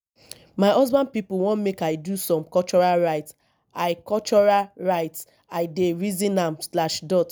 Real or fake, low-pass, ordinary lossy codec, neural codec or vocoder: real; none; none; none